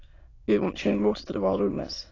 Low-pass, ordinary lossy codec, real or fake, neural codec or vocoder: 7.2 kHz; AAC, 32 kbps; fake; autoencoder, 22.05 kHz, a latent of 192 numbers a frame, VITS, trained on many speakers